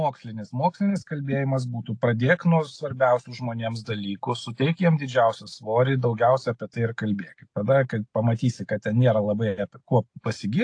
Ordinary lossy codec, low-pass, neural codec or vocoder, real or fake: AAC, 48 kbps; 9.9 kHz; none; real